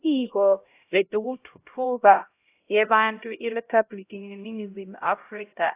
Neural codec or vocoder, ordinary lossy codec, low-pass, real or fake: codec, 16 kHz, 0.5 kbps, X-Codec, HuBERT features, trained on LibriSpeech; AAC, 24 kbps; 3.6 kHz; fake